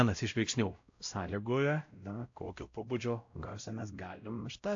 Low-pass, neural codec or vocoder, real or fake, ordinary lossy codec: 7.2 kHz; codec, 16 kHz, 0.5 kbps, X-Codec, WavLM features, trained on Multilingual LibriSpeech; fake; AAC, 48 kbps